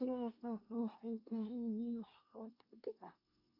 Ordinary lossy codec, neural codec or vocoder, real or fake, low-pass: AAC, 48 kbps; codec, 24 kHz, 1 kbps, SNAC; fake; 5.4 kHz